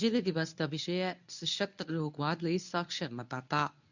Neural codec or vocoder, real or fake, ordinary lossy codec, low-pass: codec, 24 kHz, 0.9 kbps, WavTokenizer, medium speech release version 1; fake; none; 7.2 kHz